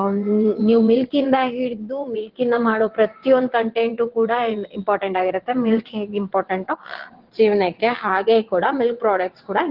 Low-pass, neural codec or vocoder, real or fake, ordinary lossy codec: 5.4 kHz; vocoder, 22.05 kHz, 80 mel bands, WaveNeXt; fake; Opus, 16 kbps